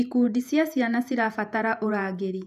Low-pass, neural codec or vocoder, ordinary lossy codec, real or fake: 14.4 kHz; vocoder, 48 kHz, 128 mel bands, Vocos; none; fake